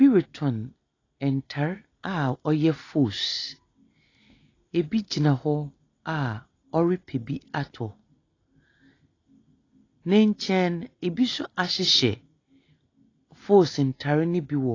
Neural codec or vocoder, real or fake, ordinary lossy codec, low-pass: none; real; AAC, 32 kbps; 7.2 kHz